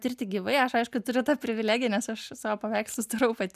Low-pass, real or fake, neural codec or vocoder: 14.4 kHz; real; none